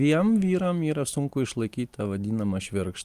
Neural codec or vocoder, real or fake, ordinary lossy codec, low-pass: none; real; Opus, 32 kbps; 14.4 kHz